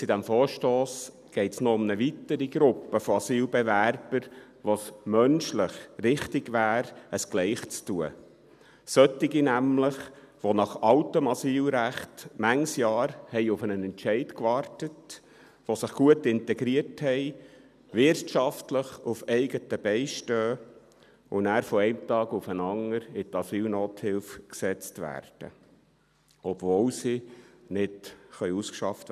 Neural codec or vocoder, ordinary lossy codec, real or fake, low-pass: none; none; real; 14.4 kHz